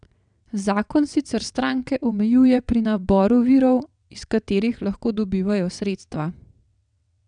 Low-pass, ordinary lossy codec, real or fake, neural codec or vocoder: 9.9 kHz; none; fake; vocoder, 22.05 kHz, 80 mel bands, WaveNeXt